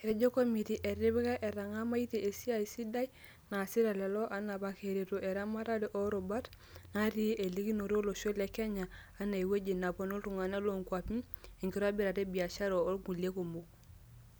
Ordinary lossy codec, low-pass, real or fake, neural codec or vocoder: none; none; real; none